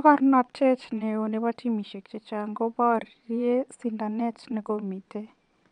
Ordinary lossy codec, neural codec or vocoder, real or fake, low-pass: none; vocoder, 22.05 kHz, 80 mel bands, Vocos; fake; 9.9 kHz